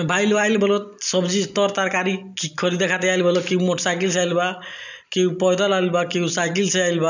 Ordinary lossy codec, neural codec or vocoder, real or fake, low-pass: none; none; real; 7.2 kHz